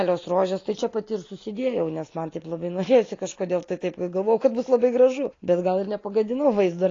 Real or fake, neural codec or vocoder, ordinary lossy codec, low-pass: real; none; AAC, 32 kbps; 7.2 kHz